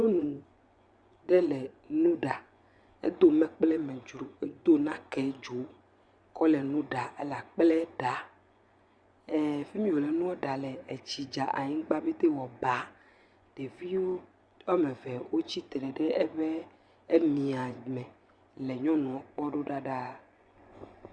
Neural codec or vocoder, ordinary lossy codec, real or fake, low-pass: vocoder, 24 kHz, 100 mel bands, Vocos; Opus, 64 kbps; fake; 9.9 kHz